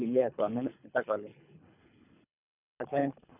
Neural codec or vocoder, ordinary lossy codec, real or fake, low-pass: codec, 24 kHz, 3 kbps, HILCodec; none; fake; 3.6 kHz